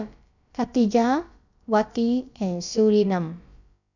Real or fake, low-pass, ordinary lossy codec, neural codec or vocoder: fake; 7.2 kHz; none; codec, 16 kHz, about 1 kbps, DyCAST, with the encoder's durations